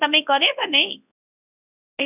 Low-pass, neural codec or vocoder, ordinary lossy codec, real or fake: 3.6 kHz; codec, 24 kHz, 0.9 kbps, WavTokenizer, large speech release; none; fake